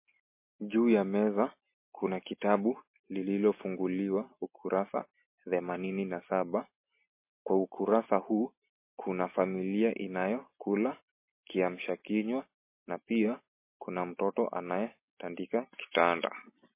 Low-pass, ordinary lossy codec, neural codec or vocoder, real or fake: 3.6 kHz; MP3, 24 kbps; none; real